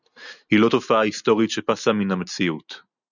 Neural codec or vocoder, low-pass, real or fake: none; 7.2 kHz; real